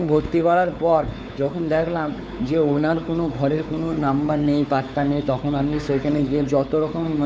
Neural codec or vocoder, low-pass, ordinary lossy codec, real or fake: codec, 16 kHz, 4 kbps, X-Codec, WavLM features, trained on Multilingual LibriSpeech; none; none; fake